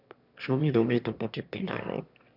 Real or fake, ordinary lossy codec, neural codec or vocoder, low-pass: fake; none; autoencoder, 22.05 kHz, a latent of 192 numbers a frame, VITS, trained on one speaker; 5.4 kHz